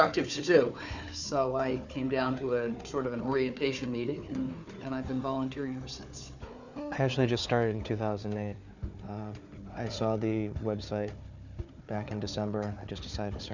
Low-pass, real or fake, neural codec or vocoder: 7.2 kHz; fake; codec, 16 kHz, 4 kbps, FunCodec, trained on Chinese and English, 50 frames a second